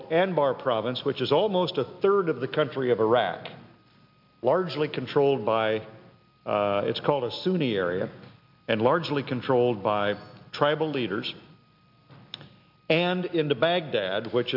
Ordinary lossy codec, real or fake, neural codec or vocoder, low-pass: MP3, 48 kbps; real; none; 5.4 kHz